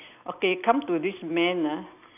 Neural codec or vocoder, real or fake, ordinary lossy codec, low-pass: none; real; none; 3.6 kHz